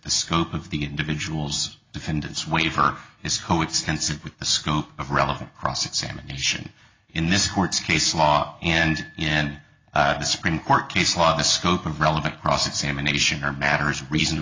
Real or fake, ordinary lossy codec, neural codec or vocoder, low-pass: real; AAC, 32 kbps; none; 7.2 kHz